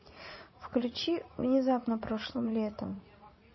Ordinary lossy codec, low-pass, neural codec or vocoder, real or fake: MP3, 24 kbps; 7.2 kHz; vocoder, 44.1 kHz, 80 mel bands, Vocos; fake